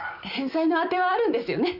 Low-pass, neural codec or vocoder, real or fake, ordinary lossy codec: 5.4 kHz; none; real; none